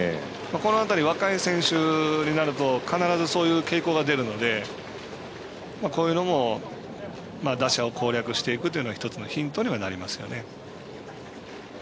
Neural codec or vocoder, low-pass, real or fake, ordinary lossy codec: none; none; real; none